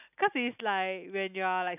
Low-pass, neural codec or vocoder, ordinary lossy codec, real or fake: 3.6 kHz; none; none; real